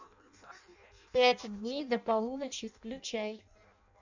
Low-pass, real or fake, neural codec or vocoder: 7.2 kHz; fake; codec, 16 kHz in and 24 kHz out, 0.6 kbps, FireRedTTS-2 codec